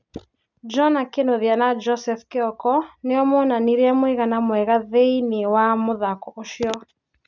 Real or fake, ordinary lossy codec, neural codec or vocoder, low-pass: real; none; none; 7.2 kHz